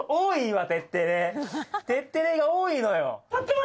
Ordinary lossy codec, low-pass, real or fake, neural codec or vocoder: none; none; real; none